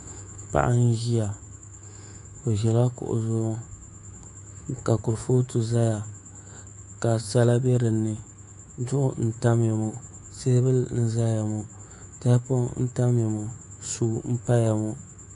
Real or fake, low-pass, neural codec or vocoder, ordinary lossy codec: fake; 10.8 kHz; codec, 24 kHz, 3.1 kbps, DualCodec; AAC, 48 kbps